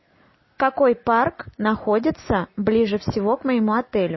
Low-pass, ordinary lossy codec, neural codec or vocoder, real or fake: 7.2 kHz; MP3, 24 kbps; none; real